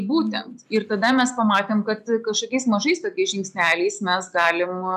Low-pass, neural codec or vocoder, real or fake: 14.4 kHz; none; real